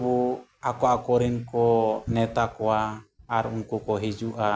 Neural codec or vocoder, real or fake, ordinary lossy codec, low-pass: none; real; none; none